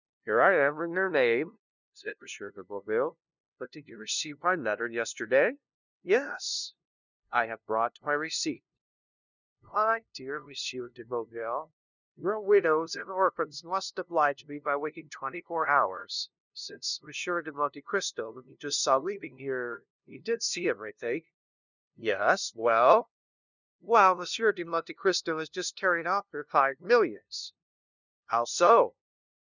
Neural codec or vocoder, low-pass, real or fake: codec, 16 kHz, 0.5 kbps, FunCodec, trained on LibriTTS, 25 frames a second; 7.2 kHz; fake